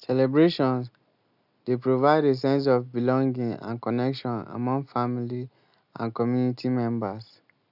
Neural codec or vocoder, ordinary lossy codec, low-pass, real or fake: none; none; 5.4 kHz; real